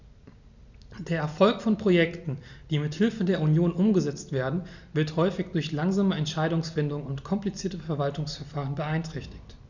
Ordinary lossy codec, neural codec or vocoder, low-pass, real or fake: none; none; 7.2 kHz; real